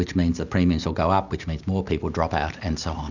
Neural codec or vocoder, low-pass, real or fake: none; 7.2 kHz; real